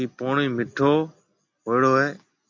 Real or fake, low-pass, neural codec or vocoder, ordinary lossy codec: real; 7.2 kHz; none; AAC, 48 kbps